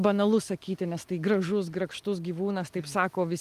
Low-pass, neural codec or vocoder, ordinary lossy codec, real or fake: 14.4 kHz; none; Opus, 32 kbps; real